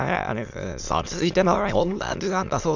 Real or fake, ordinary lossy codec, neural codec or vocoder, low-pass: fake; Opus, 64 kbps; autoencoder, 22.05 kHz, a latent of 192 numbers a frame, VITS, trained on many speakers; 7.2 kHz